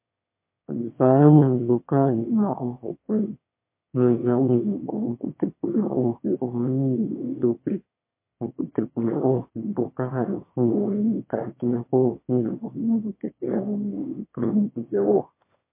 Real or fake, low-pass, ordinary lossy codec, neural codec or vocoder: fake; 3.6 kHz; MP3, 24 kbps; autoencoder, 22.05 kHz, a latent of 192 numbers a frame, VITS, trained on one speaker